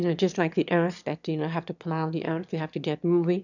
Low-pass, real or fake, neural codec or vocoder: 7.2 kHz; fake; autoencoder, 22.05 kHz, a latent of 192 numbers a frame, VITS, trained on one speaker